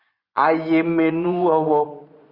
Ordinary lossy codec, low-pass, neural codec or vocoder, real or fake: Opus, 64 kbps; 5.4 kHz; vocoder, 24 kHz, 100 mel bands, Vocos; fake